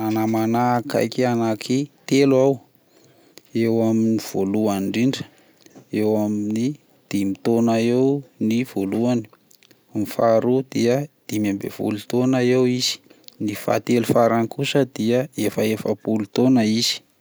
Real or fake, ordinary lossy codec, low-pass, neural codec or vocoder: real; none; none; none